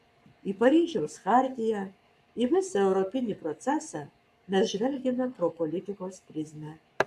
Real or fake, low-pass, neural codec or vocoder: fake; 14.4 kHz; codec, 44.1 kHz, 7.8 kbps, Pupu-Codec